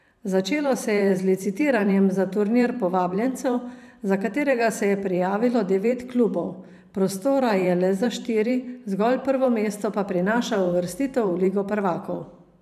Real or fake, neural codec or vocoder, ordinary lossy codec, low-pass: fake; vocoder, 44.1 kHz, 128 mel bands every 512 samples, BigVGAN v2; none; 14.4 kHz